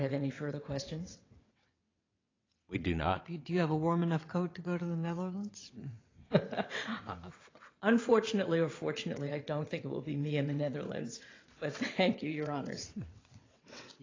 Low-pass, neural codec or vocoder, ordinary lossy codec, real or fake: 7.2 kHz; vocoder, 22.05 kHz, 80 mel bands, WaveNeXt; AAC, 32 kbps; fake